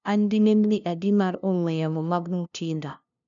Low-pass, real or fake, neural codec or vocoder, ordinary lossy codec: 7.2 kHz; fake; codec, 16 kHz, 0.5 kbps, FunCodec, trained on LibriTTS, 25 frames a second; none